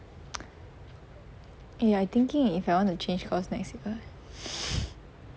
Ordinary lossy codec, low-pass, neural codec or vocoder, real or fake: none; none; none; real